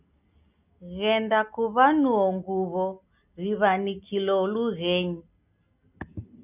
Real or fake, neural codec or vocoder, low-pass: real; none; 3.6 kHz